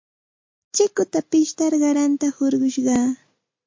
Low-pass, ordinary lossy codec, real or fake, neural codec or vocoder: 7.2 kHz; MP3, 48 kbps; real; none